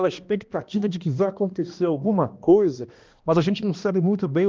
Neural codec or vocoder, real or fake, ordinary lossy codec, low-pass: codec, 16 kHz, 1 kbps, X-Codec, HuBERT features, trained on balanced general audio; fake; Opus, 32 kbps; 7.2 kHz